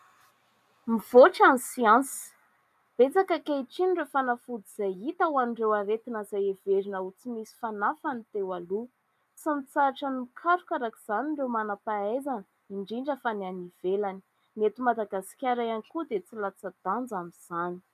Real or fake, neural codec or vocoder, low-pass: real; none; 14.4 kHz